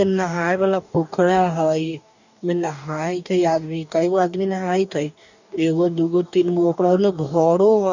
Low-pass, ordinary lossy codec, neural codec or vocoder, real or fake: 7.2 kHz; none; codec, 44.1 kHz, 2.6 kbps, DAC; fake